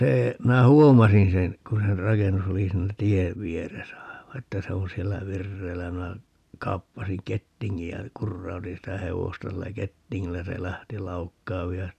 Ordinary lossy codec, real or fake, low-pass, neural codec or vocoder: none; real; 14.4 kHz; none